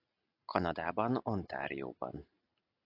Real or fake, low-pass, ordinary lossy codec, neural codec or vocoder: real; 5.4 kHz; AAC, 32 kbps; none